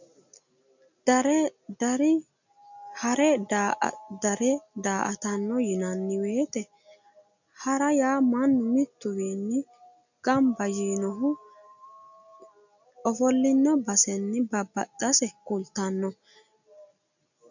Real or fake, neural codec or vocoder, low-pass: real; none; 7.2 kHz